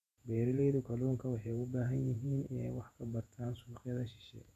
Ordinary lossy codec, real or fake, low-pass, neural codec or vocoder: none; fake; 14.4 kHz; vocoder, 48 kHz, 128 mel bands, Vocos